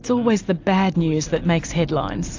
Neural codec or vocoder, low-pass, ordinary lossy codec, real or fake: none; 7.2 kHz; AAC, 48 kbps; real